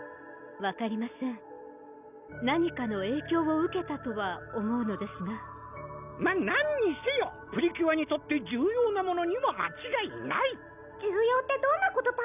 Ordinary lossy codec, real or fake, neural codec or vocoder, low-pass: none; real; none; 3.6 kHz